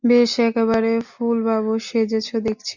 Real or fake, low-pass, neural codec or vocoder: real; 7.2 kHz; none